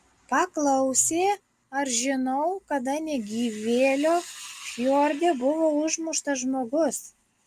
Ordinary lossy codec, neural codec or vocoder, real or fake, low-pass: Opus, 32 kbps; none; real; 14.4 kHz